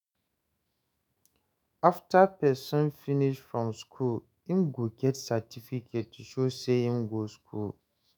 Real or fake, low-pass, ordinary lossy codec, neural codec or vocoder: fake; none; none; autoencoder, 48 kHz, 128 numbers a frame, DAC-VAE, trained on Japanese speech